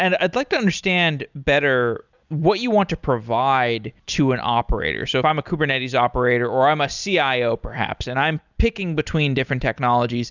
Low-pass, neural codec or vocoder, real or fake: 7.2 kHz; none; real